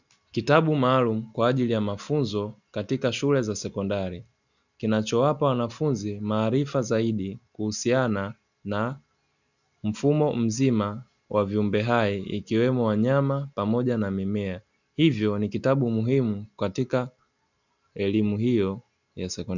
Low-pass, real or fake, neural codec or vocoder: 7.2 kHz; real; none